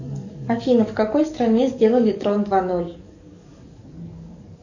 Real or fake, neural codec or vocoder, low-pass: fake; codec, 44.1 kHz, 7.8 kbps, DAC; 7.2 kHz